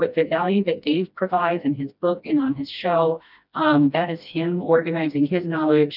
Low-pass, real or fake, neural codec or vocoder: 5.4 kHz; fake; codec, 16 kHz, 1 kbps, FreqCodec, smaller model